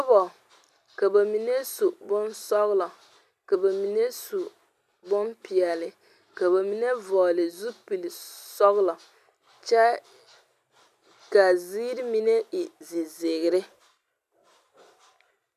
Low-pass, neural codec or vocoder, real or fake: 14.4 kHz; none; real